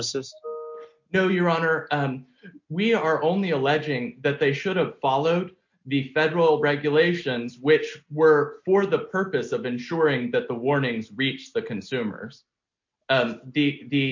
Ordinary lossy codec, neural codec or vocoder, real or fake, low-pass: MP3, 48 kbps; none; real; 7.2 kHz